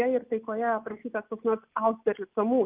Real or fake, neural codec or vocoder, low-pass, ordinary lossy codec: real; none; 3.6 kHz; Opus, 24 kbps